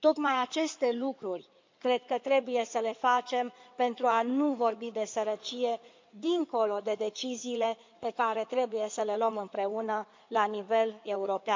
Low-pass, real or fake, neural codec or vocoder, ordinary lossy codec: 7.2 kHz; fake; codec, 16 kHz in and 24 kHz out, 2.2 kbps, FireRedTTS-2 codec; MP3, 64 kbps